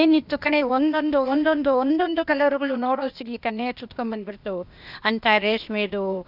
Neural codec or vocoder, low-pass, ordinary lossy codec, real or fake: codec, 16 kHz, 0.8 kbps, ZipCodec; 5.4 kHz; none; fake